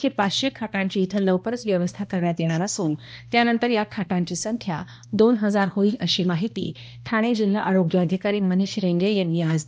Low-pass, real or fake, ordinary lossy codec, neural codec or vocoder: none; fake; none; codec, 16 kHz, 1 kbps, X-Codec, HuBERT features, trained on balanced general audio